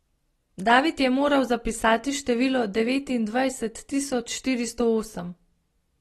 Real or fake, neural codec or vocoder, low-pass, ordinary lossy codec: real; none; 19.8 kHz; AAC, 32 kbps